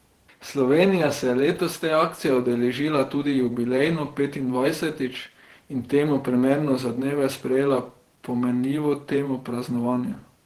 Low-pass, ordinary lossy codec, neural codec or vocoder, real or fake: 14.4 kHz; Opus, 16 kbps; vocoder, 48 kHz, 128 mel bands, Vocos; fake